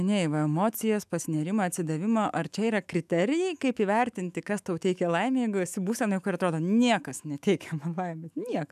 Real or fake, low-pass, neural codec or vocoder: fake; 14.4 kHz; autoencoder, 48 kHz, 128 numbers a frame, DAC-VAE, trained on Japanese speech